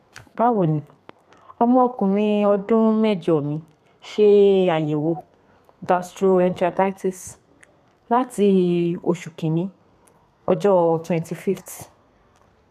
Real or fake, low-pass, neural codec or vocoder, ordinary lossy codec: fake; 14.4 kHz; codec, 32 kHz, 1.9 kbps, SNAC; none